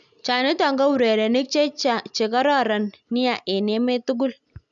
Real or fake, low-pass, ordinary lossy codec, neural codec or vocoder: real; 7.2 kHz; none; none